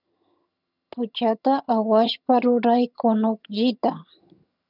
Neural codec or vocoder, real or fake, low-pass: vocoder, 22.05 kHz, 80 mel bands, HiFi-GAN; fake; 5.4 kHz